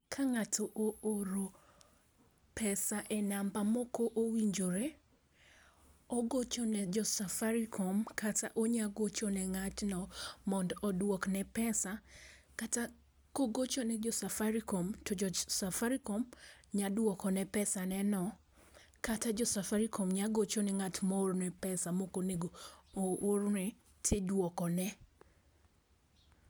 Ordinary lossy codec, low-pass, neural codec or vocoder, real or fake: none; none; none; real